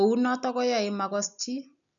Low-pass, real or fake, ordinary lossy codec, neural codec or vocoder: 7.2 kHz; real; none; none